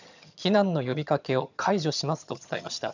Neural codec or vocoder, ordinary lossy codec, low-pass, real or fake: vocoder, 22.05 kHz, 80 mel bands, HiFi-GAN; none; 7.2 kHz; fake